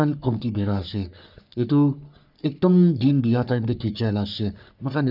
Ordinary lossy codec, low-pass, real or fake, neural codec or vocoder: none; 5.4 kHz; fake; codec, 44.1 kHz, 3.4 kbps, Pupu-Codec